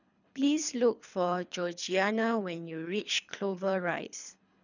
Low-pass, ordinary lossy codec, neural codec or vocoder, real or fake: 7.2 kHz; none; codec, 24 kHz, 3 kbps, HILCodec; fake